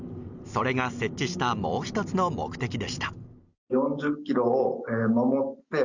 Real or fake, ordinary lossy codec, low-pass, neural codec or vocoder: real; Opus, 64 kbps; 7.2 kHz; none